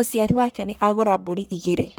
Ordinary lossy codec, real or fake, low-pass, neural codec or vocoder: none; fake; none; codec, 44.1 kHz, 1.7 kbps, Pupu-Codec